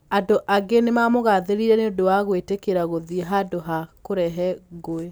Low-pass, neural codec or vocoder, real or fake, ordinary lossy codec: none; none; real; none